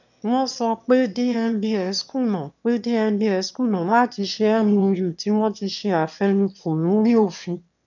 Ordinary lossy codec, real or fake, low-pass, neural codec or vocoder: none; fake; 7.2 kHz; autoencoder, 22.05 kHz, a latent of 192 numbers a frame, VITS, trained on one speaker